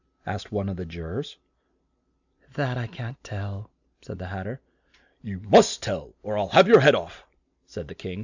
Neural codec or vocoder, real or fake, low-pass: none; real; 7.2 kHz